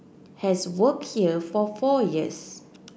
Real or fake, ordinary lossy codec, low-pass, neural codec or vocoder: real; none; none; none